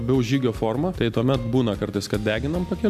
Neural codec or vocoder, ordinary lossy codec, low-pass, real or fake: none; MP3, 96 kbps; 14.4 kHz; real